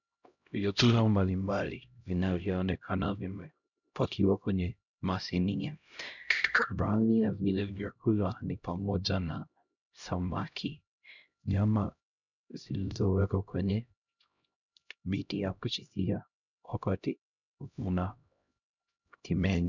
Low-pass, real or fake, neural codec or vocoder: 7.2 kHz; fake; codec, 16 kHz, 0.5 kbps, X-Codec, HuBERT features, trained on LibriSpeech